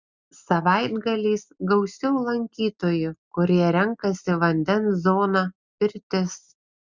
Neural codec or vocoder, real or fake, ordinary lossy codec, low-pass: none; real; Opus, 64 kbps; 7.2 kHz